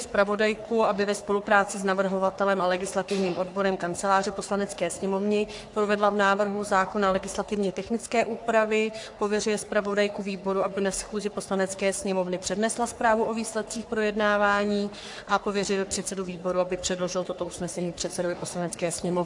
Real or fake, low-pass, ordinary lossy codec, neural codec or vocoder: fake; 10.8 kHz; AAC, 64 kbps; codec, 44.1 kHz, 3.4 kbps, Pupu-Codec